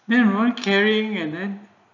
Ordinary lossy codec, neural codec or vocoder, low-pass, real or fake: none; none; 7.2 kHz; real